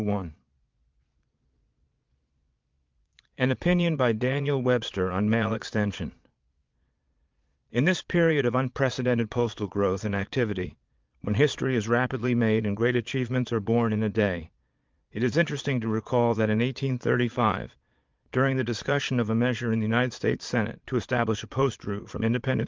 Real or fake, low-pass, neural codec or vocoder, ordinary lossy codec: fake; 7.2 kHz; vocoder, 22.05 kHz, 80 mel bands, Vocos; Opus, 24 kbps